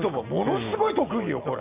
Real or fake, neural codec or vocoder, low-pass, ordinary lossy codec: real; none; 3.6 kHz; Opus, 64 kbps